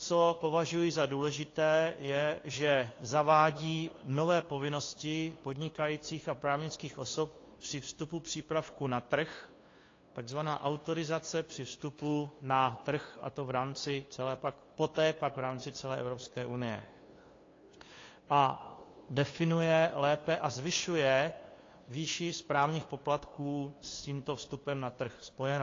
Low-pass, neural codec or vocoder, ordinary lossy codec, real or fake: 7.2 kHz; codec, 16 kHz, 2 kbps, FunCodec, trained on LibriTTS, 25 frames a second; AAC, 32 kbps; fake